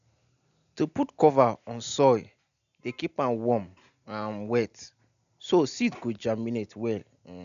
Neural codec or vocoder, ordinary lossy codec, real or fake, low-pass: none; none; real; 7.2 kHz